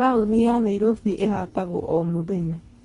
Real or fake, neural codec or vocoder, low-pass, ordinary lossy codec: fake; codec, 24 kHz, 1.5 kbps, HILCodec; 10.8 kHz; AAC, 32 kbps